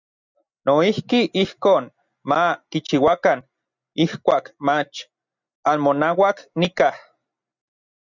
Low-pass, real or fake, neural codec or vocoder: 7.2 kHz; real; none